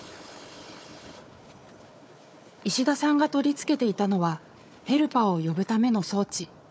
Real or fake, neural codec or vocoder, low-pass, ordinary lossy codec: fake; codec, 16 kHz, 4 kbps, FunCodec, trained on Chinese and English, 50 frames a second; none; none